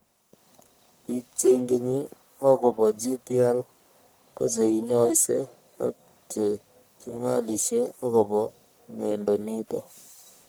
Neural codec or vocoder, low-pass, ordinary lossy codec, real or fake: codec, 44.1 kHz, 1.7 kbps, Pupu-Codec; none; none; fake